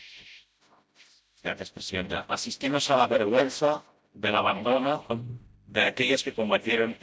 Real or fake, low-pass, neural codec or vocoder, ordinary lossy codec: fake; none; codec, 16 kHz, 0.5 kbps, FreqCodec, smaller model; none